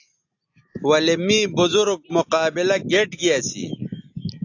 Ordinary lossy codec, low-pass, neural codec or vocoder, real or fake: AAC, 48 kbps; 7.2 kHz; none; real